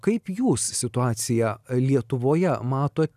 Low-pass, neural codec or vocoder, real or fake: 14.4 kHz; none; real